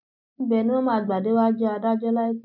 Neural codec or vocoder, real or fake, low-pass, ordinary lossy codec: none; real; 5.4 kHz; none